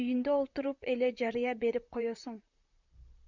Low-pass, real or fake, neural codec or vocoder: 7.2 kHz; fake; vocoder, 44.1 kHz, 128 mel bands, Pupu-Vocoder